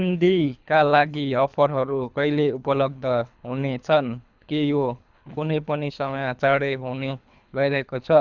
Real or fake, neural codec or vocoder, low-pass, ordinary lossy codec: fake; codec, 24 kHz, 3 kbps, HILCodec; 7.2 kHz; none